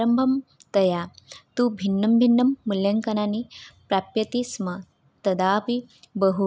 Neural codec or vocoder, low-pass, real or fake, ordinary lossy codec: none; none; real; none